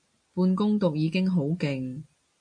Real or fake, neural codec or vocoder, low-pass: real; none; 9.9 kHz